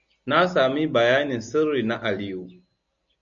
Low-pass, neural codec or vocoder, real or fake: 7.2 kHz; none; real